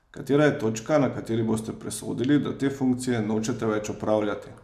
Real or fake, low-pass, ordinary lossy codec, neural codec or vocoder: real; 14.4 kHz; none; none